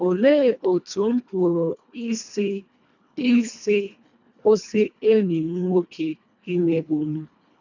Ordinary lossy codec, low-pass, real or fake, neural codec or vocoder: none; 7.2 kHz; fake; codec, 24 kHz, 1.5 kbps, HILCodec